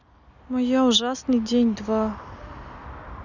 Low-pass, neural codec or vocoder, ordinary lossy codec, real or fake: 7.2 kHz; none; none; real